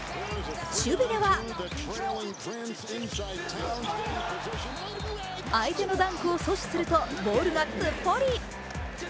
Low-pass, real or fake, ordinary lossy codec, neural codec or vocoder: none; real; none; none